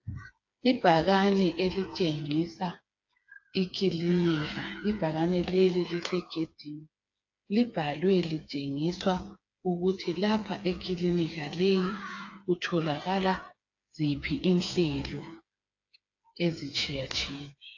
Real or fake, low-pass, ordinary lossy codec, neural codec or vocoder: fake; 7.2 kHz; AAC, 48 kbps; codec, 16 kHz, 4 kbps, FreqCodec, smaller model